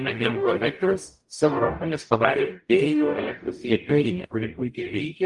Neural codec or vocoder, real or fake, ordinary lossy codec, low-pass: codec, 44.1 kHz, 0.9 kbps, DAC; fake; Opus, 32 kbps; 10.8 kHz